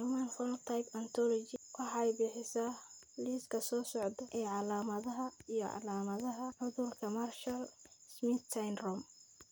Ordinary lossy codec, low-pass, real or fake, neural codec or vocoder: none; none; real; none